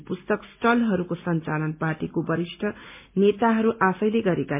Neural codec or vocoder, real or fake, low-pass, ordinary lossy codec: none; real; 3.6 kHz; MP3, 32 kbps